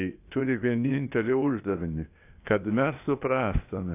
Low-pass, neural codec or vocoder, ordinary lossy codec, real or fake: 3.6 kHz; codec, 16 kHz, 0.8 kbps, ZipCodec; AAC, 24 kbps; fake